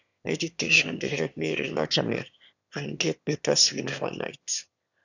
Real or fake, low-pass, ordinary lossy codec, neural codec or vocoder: fake; 7.2 kHz; none; autoencoder, 22.05 kHz, a latent of 192 numbers a frame, VITS, trained on one speaker